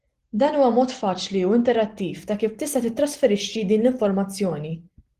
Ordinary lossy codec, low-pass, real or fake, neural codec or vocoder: Opus, 16 kbps; 14.4 kHz; real; none